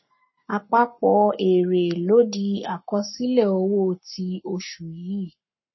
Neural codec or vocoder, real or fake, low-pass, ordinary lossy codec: codec, 44.1 kHz, 7.8 kbps, Pupu-Codec; fake; 7.2 kHz; MP3, 24 kbps